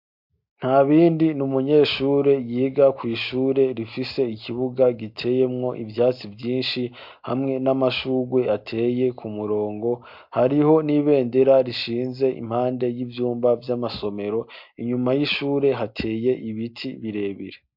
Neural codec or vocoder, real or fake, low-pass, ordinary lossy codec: none; real; 5.4 kHz; MP3, 48 kbps